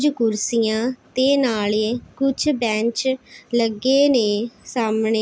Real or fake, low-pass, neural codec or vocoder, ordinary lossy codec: real; none; none; none